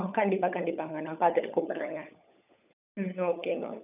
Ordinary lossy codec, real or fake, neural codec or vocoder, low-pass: none; fake; codec, 16 kHz, 16 kbps, FunCodec, trained on LibriTTS, 50 frames a second; 3.6 kHz